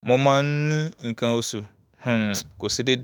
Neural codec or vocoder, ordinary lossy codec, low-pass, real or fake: autoencoder, 48 kHz, 32 numbers a frame, DAC-VAE, trained on Japanese speech; none; none; fake